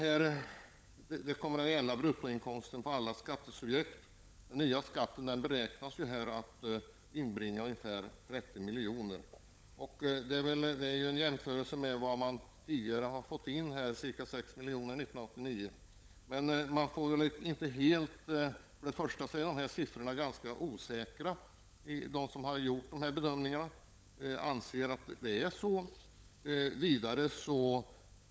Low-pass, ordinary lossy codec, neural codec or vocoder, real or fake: none; none; codec, 16 kHz, 16 kbps, FunCodec, trained on LibriTTS, 50 frames a second; fake